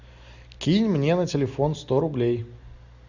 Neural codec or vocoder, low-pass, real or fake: none; 7.2 kHz; real